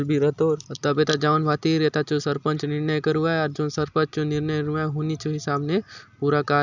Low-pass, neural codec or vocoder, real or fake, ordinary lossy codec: 7.2 kHz; none; real; none